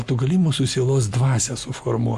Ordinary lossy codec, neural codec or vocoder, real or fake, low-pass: Opus, 64 kbps; none; real; 14.4 kHz